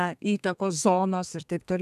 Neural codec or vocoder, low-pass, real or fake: codec, 32 kHz, 1.9 kbps, SNAC; 14.4 kHz; fake